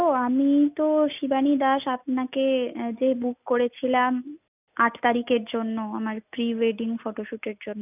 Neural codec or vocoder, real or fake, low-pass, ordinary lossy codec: none; real; 3.6 kHz; none